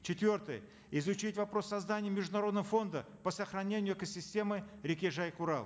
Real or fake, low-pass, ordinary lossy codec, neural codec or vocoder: real; none; none; none